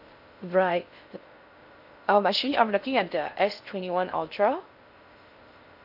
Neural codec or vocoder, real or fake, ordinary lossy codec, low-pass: codec, 16 kHz in and 24 kHz out, 0.6 kbps, FocalCodec, streaming, 2048 codes; fake; none; 5.4 kHz